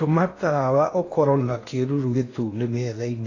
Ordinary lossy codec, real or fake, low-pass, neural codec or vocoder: AAC, 32 kbps; fake; 7.2 kHz; codec, 16 kHz in and 24 kHz out, 0.8 kbps, FocalCodec, streaming, 65536 codes